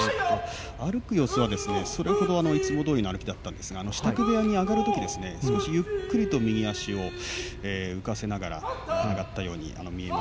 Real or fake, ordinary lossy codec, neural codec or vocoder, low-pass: real; none; none; none